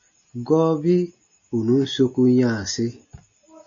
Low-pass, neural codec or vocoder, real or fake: 7.2 kHz; none; real